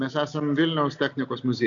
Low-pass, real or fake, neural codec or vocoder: 7.2 kHz; real; none